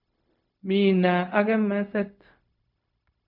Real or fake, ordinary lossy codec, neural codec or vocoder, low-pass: fake; none; codec, 16 kHz, 0.4 kbps, LongCat-Audio-Codec; 5.4 kHz